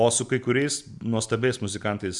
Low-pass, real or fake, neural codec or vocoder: 10.8 kHz; real; none